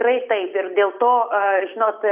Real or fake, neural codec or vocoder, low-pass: real; none; 3.6 kHz